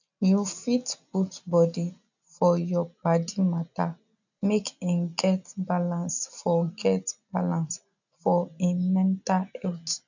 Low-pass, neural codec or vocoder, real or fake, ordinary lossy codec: 7.2 kHz; none; real; none